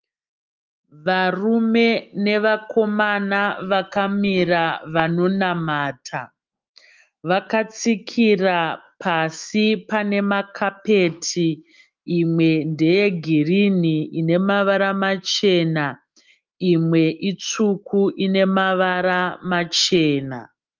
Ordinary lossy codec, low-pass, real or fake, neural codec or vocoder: Opus, 24 kbps; 7.2 kHz; fake; autoencoder, 48 kHz, 128 numbers a frame, DAC-VAE, trained on Japanese speech